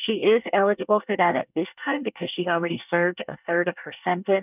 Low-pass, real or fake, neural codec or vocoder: 3.6 kHz; fake; codec, 24 kHz, 1 kbps, SNAC